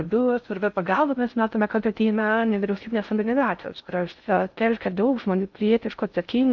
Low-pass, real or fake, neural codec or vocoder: 7.2 kHz; fake; codec, 16 kHz in and 24 kHz out, 0.6 kbps, FocalCodec, streaming, 4096 codes